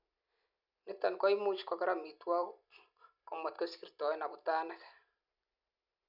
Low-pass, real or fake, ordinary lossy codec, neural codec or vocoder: 5.4 kHz; real; none; none